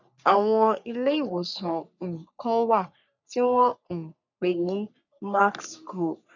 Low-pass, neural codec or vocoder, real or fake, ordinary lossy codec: 7.2 kHz; codec, 44.1 kHz, 3.4 kbps, Pupu-Codec; fake; none